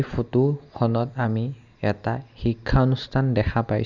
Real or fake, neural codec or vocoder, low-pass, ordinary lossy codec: real; none; 7.2 kHz; none